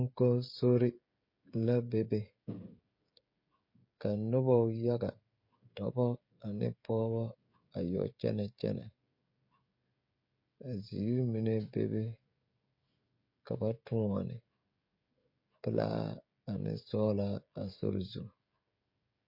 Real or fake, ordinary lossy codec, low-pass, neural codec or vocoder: fake; MP3, 32 kbps; 5.4 kHz; codec, 16 kHz, 16 kbps, FreqCodec, smaller model